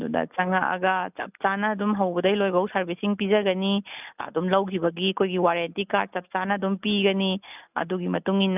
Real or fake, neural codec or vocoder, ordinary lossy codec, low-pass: real; none; none; 3.6 kHz